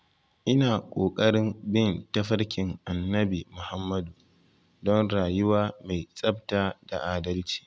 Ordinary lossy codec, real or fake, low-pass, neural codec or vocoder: none; real; none; none